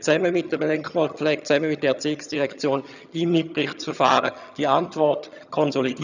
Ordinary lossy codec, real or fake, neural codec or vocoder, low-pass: none; fake; vocoder, 22.05 kHz, 80 mel bands, HiFi-GAN; 7.2 kHz